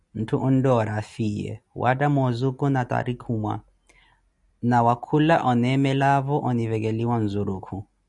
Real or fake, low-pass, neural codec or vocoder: real; 10.8 kHz; none